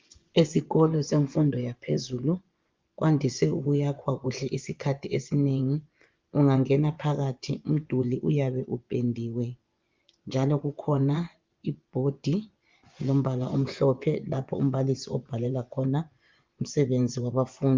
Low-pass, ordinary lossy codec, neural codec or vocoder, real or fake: 7.2 kHz; Opus, 32 kbps; vocoder, 24 kHz, 100 mel bands, Vocos; fake